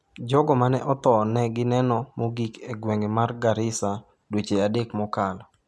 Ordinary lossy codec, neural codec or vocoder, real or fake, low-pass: none; none; real; none